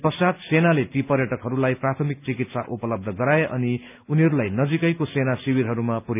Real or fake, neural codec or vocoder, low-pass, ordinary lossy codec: real; none; 3.6 kHz; none